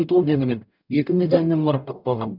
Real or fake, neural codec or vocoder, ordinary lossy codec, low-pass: fake; codec, 44.1 kHz, 0.9 kbps, DAC; none; 5.4 kHz